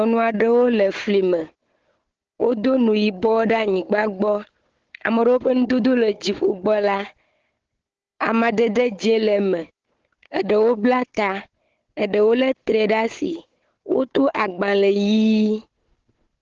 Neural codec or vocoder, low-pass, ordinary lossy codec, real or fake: codec, 16 kHz, 4 kbps, FunCodec, trained on Chinese and English, 50 frames a second; 7.2 kHz; Opus, 16 kbps; fake